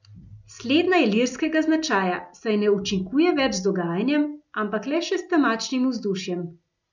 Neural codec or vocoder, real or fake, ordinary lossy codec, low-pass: none; real; none; 7.2 kHz